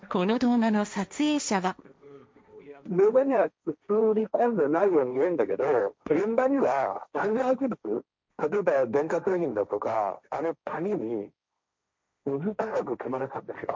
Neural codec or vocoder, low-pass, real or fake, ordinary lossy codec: codec, 16 kHz, 1.1 kbps, Voila-Tokenizer; none; fake; none